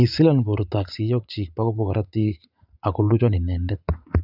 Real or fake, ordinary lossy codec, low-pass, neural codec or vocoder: fake; none; 5.4 kHz; vocoder, 44.1 kHz, 80 mel bands, Vocos